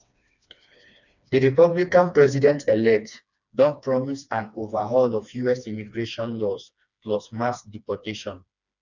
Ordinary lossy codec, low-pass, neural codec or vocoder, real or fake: none; 7.2 kHz; codec, 16 kHz, 2 kbps, FreqCodec, smaller model; fake